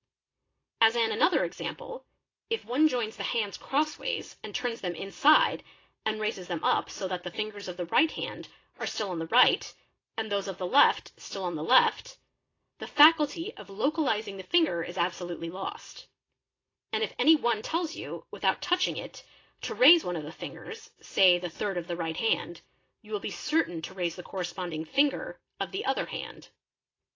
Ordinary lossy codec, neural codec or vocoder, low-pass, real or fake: AAC, 32 kbps; none; 7.2 kHz; real